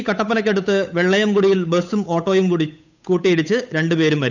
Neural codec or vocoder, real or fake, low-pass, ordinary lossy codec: codec, 16 kHz, 8 kbps, FunCodec, trained on Chinese and English, 25 frames a second; fake; 7.2 kHz; none